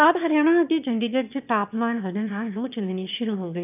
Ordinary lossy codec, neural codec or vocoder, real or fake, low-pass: none; autoencoder, 22.05 kHz, a latent of 192 numbers a frame, VITS, trained on one speaker; fake; 3.6 kHz